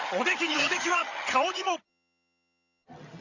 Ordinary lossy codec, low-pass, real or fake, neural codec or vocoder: none; 7.2 kHz; fake; vocoder, 22.05 kHz, 80 mel bands, HiFi-GAN